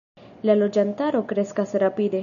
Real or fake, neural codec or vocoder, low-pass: real; none; 7.2 kHz